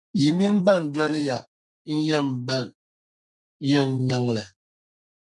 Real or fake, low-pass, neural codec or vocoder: fake; 10.8 kHz; codec, 32 kHz, 1.9 kbps, SNAC